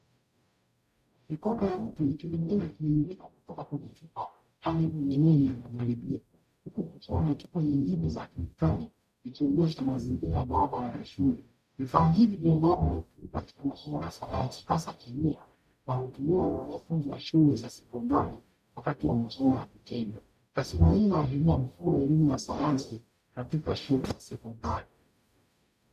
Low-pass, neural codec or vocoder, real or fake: 14.4 kHz; codec, 44.1 kHz, 0.9 kbps, DAC; fake